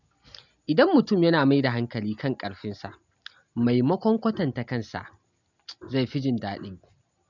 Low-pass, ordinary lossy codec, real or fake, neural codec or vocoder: 7.2 kHz; none; real; none